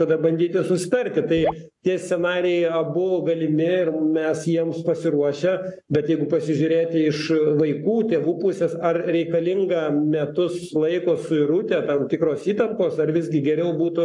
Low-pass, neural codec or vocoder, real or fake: 10.8 kHz; codec, 44.1 kHz, 7.8 kbps, Pupu-Codec; fake